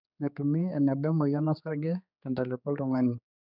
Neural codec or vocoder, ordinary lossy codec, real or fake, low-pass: codec, 16 kHz, 4 kbps, X-Codec, HuBERT features, trained on general audio; none; fake; 5.4 kHz